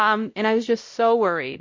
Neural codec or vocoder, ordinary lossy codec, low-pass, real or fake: codec, 16 kHz, 0.5 kbps, X-Codec, WavLM features, trained on Multilingual LibriSpeech; MP3, 48 kbps; 7.2 kHz; fake